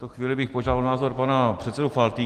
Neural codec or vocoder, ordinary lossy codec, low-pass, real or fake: none; Opus, 24 kbps; 14.4 kHz; real